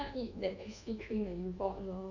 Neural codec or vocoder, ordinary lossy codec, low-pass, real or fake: codec, 24 kHz, 1.2 kbps, DualCodec; none; 7.2 kHz; fake